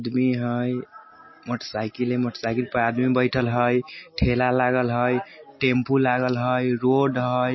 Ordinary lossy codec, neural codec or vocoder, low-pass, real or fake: MP3, 24 kbps; none; 7.2 kHz; real